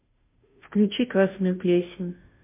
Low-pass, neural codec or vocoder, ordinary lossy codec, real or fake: 3.6 kHz; codec, 16 kHz, 0.5 kbps, FunCodec, trained on Chinese and English, 25 frames a second; MP3, 32 kbps; fake